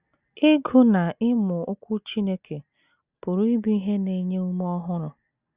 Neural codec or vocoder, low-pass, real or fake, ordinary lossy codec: none; 3.6 kHz; real; Opus, 64 kbps